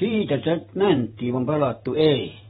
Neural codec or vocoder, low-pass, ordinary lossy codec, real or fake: vocoder, 48 kHz, 128 mel bands, Vocos; 19.8 kHz; AAC, 16 kbps; fake